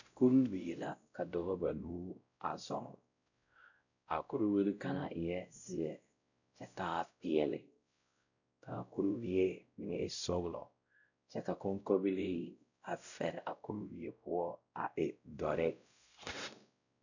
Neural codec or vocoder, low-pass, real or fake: codec, 16 kHz, 0.5 kbps, X-Codec, WavLM features, trained on Multilingual LibriSpeech; 7.2 kHz; fake